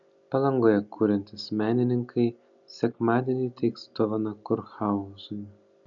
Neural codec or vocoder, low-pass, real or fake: none; 7.2 kHz; real